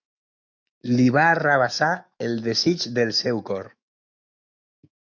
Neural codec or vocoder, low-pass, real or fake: autoencoder, 48 kHz, 128 numbers a frame, DAC-VAE, trained on Japanese speech; 7.2 kHz; fake